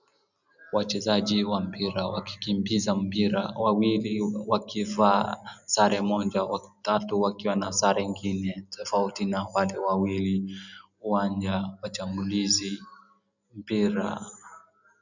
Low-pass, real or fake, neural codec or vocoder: 7.2 kHz; real; none